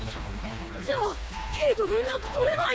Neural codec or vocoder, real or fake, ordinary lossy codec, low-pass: codec, 16 kHz, 2 kbps, FreqCodec, smaller model; fake; none; none